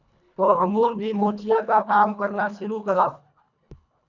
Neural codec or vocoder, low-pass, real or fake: codec, 24 kHz, 1.5 kbps, HILCodec; 7.2 kHz; fake